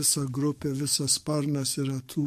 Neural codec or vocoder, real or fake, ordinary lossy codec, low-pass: codec, 44.1 kHz, 7.8 kbps, DAC; fake; MP3, 64 kbps; 14.4 kHz